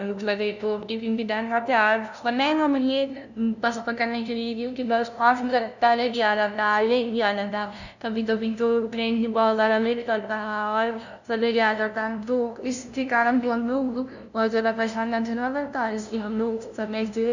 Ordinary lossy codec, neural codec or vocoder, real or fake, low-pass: none; codec, 16 kHz, 0.5 kbps, FunCodec, trained on LibriTTS, 25 frames a second; fake; 7.2 kHz